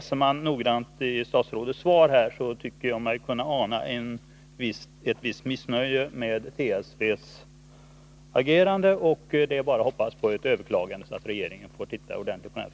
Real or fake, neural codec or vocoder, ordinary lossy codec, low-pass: real; none; none; none